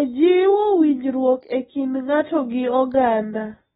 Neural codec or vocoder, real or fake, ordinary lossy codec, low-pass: none; real; AAC, 16 kbps; 7.2 kHz